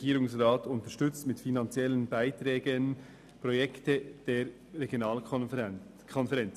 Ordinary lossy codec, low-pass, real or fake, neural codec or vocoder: none; 14.4 kHz; real; none